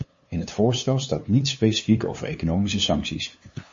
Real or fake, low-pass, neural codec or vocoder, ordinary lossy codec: fake; 7.2 kHz; codec, 16 kHz, 2 kbps, FunCodec, trained on LibriTTS, 25 frames a second; MP3, 32 kbps